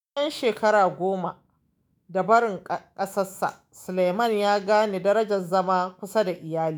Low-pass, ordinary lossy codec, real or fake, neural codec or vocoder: none; none; fake; autoencoder, 48 kHz, 128 numbers a frame, DAC-VAE, trained on Japanese speech